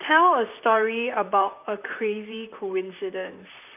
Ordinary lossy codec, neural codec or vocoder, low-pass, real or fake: none; vocoder, 44.1 kHz, 128 mel bands, Pupu-Vocoder; 3.6 kHz; fake